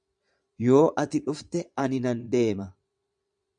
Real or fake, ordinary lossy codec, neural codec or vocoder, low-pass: fake; MP3, 96 kbps; vocoder, 22.05 kHz, 80 mel bands, Vocos; 9.9 kHz